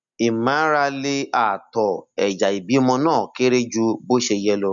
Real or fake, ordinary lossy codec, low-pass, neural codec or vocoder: real; none; 7.2 kHz; none